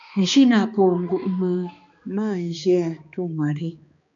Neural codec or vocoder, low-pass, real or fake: codec, 16 kHz, 2 kbps, X-Codec, HuBERT features, trained on balanced general audio; 7.2 kHz; fake